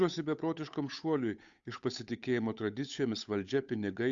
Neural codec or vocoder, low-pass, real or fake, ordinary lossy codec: codec, 16 kHz, 8 kbps, FunCodec, trained on Chinese and English, 25 frames a second; 7.2 kHz; fake; Opus, 64 kbps